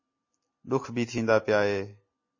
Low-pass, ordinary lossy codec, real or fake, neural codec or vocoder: 7.2 kHz; MP3, 32 kbps; real; none